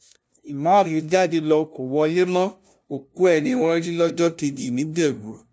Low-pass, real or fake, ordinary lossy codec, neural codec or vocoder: none; fake; none; codec, 16 kHz, 0.5 kbps, FunCodec, trained on LibriTTS, 25 frames a second